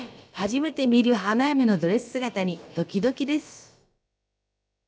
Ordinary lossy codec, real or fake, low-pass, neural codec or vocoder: none; fake; none; codec, 16 kHz, about 1 kbps, DyCAST, with the encoder's durations